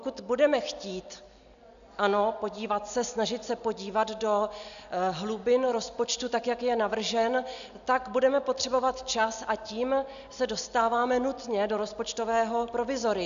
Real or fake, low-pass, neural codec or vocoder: real; 7.2 kHz; none